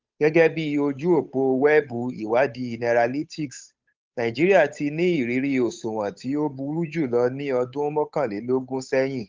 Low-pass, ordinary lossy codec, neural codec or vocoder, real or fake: 7.2 kHz; Opus, 32 kbps; codec, 16 kHz, 8 kbps, FunCodec, trained on Chinese and English, 25 frames a second; fake